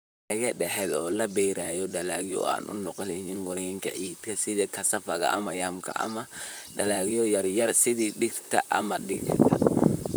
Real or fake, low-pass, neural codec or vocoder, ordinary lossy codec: fake; none; vocoder, 44.1 kHz, 128 mel bands, Pupu-Vocoder; none